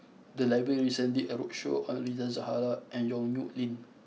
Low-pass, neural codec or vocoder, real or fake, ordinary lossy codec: none; none; real; none